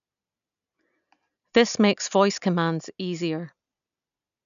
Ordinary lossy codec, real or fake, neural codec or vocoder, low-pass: none; real; none; 7.2 kHz